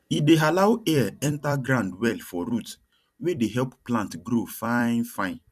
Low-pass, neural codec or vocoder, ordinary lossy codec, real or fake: 14.4 kHz; vocoder, 44.1 kHz, 128 mel bands every 256 samples, BigVGAN v2; none; fake